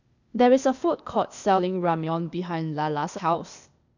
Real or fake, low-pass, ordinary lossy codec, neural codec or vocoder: fake; 7.2 kHz; none; codec, 16 kHz, 0.8 kbps, ZipCodec